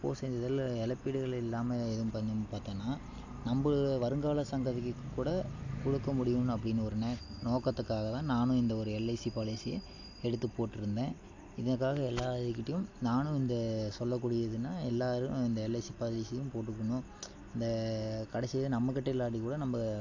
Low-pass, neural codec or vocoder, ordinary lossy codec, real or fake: 7.2 kHz; none; AAC, 48 kbps; real